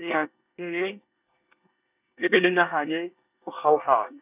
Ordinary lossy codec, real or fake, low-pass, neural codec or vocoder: none; fake; 3.6 kHz; codec, 24 kHz, 1 kbps, SNAC